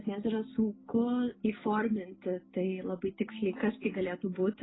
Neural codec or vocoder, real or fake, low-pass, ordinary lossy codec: none; real; 7.2 kHz; AAC, 16 kbps